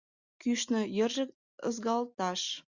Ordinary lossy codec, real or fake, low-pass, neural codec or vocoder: Opus, 64 kbps; real; 7.2 kHz; none